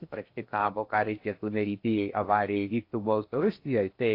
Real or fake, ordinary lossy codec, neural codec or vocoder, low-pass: fake; AAC, 32 kbps; codec, 16 kHz in and 24 kHz out, 0.6 kbps, FocalCodec, streaming, 2048 codes; 5.4 kHz